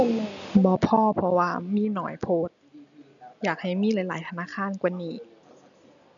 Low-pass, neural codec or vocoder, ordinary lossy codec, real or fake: 7.2 kHz; none; none; real